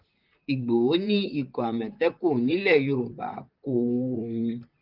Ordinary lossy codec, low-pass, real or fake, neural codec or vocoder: Opus, 32 kbps; 5.4 kHz; fake; vocoder, 44.1 kHz, 128 mel bands, Pupu-Vocoder